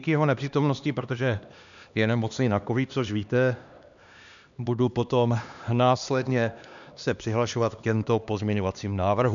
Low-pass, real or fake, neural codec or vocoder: 7.2 kHz; fake; codec, 16 kHz, 2 kbps, X-Codec, HuBERT features, trained on LibriSpeech